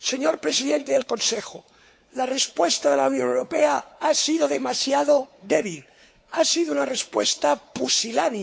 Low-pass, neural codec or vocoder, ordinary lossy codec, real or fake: none; codec, 16 kHz, 4 kbps, X-Codec, WavLM features, trained on Multilingual LibriSpeech; none; fake